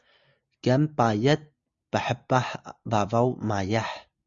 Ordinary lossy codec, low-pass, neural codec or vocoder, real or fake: Opus, 64 kbps; 7.2 kHz; none; real